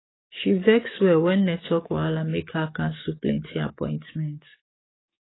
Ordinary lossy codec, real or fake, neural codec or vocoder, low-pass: AAC, 16 kbps; fake; codec, 44.1 kHz, 7.8 kbps, DAC; 7.2 kHz